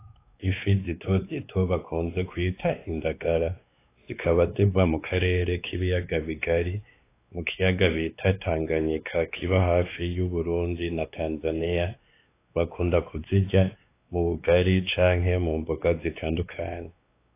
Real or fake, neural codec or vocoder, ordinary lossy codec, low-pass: fake; codec, 16 kHz, 2 kbps, X-Codec, WavLM features, trained on Multilingual LibriSpeech; AAC, 24 kbps; 3.6 kHz